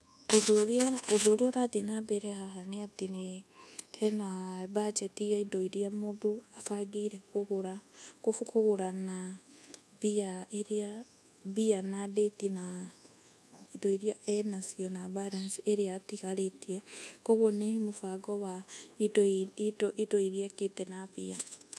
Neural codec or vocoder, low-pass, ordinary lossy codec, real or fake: codec, 24 kHz, 1.2 kbps, DualCodec; none; none; fake